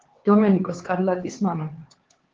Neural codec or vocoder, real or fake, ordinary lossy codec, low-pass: codec, 16 kHz, 4 kbps, X-Codec, HuBERT features, trained on LibriSpeech; fake; Opus, 16 kbps; 7.2 kHz